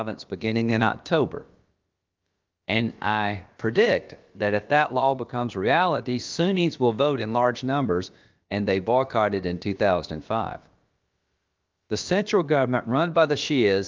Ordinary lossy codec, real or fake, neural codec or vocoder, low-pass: Opus, 24 kbps; fake; codec, 16 kHz, about 1 kbps, DyCAST, with the encoder's durations; 7.2 kHz